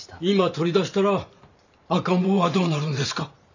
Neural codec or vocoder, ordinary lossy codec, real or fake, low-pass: vocoder, 44.1 kHz, 128 mel bands every 512 samples, BigVGAN v2; none; fake; 7.2 kHz